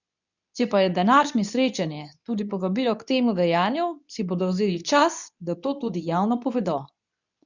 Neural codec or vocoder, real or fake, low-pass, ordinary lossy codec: codec, 24 kHz, 0.9 kbps, WavTokenizer, medium speech release version 2; fake; 7.2 kHz; none